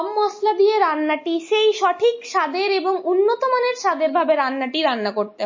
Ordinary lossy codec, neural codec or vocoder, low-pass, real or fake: MP3, 32 kbps; none; 7.2 kHz; real